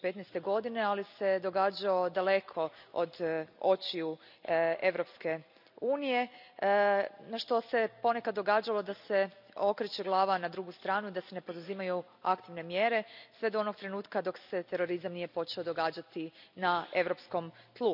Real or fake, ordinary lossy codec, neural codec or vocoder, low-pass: real; none; none; 5.4 kHz